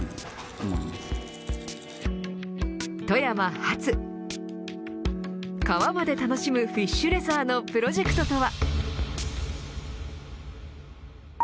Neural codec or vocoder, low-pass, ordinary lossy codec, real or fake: none; none; none; real